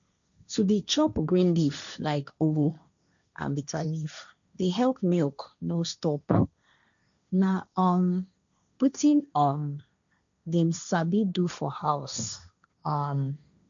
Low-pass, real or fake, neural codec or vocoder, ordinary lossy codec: 7.2 kHz; fake; codec, 16 kHz, 1.1 kbps, Voila-Tokenizer; none